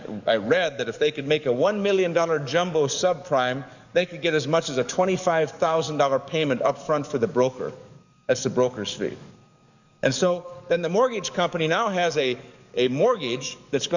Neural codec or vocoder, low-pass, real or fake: codec, 44.1 kHz, 7.8 kbps, DAC; 7.2 kHz; fake